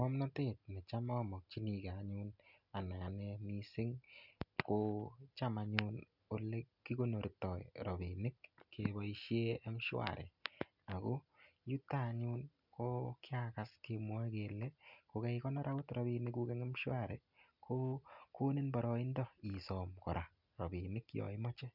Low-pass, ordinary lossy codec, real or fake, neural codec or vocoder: 5.4 kHz; none; real; none